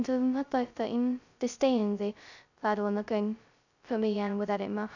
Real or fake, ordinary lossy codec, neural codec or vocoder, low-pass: fake; none; codec, 16 kHz, 0.2 kbps, FocalCodec; 7.2 kHz